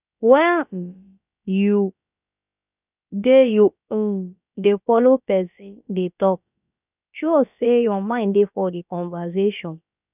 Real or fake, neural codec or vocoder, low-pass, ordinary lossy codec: fake; codec, 16 kHz, about 1 kbps, DyCAST, with the encoder's durations; 3.6 kHz; none